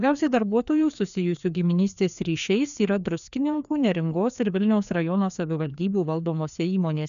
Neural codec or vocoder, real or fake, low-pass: codec, 16 kHz, 2 kbps, FreqCodec, larger model; fake; 7.2 kHz